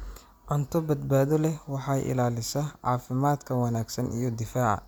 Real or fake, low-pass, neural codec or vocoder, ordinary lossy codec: real; none; none; none